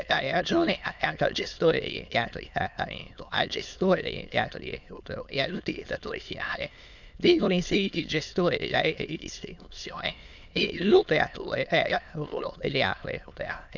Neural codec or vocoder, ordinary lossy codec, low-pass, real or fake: autoencoder, 22.05 kHz, a latent of 192 numbers a frame, VITS, trained on many speakers; none; 7.2 kHz; fake